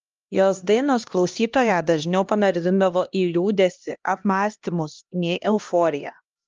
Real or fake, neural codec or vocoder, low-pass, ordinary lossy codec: fake; codec, 16 kHz, 1 kbps, X-Codec, HuBERT features, trained on LibriSpeech; 7.2 kHz; Opus, 24 kbps